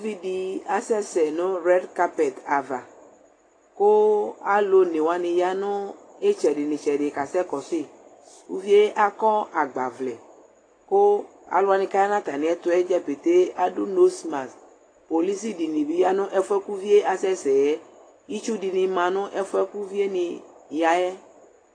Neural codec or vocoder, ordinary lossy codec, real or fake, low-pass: none; AAC, 32 kbps; real; 9.9 kHz